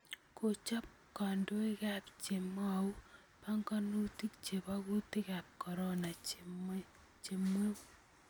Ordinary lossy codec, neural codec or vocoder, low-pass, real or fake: none; none; none; real